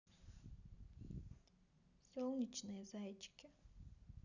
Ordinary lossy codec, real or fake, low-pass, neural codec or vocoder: none; real; 7.2 kHz; none